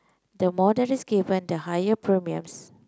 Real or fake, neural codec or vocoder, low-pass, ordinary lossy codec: real; none; none; none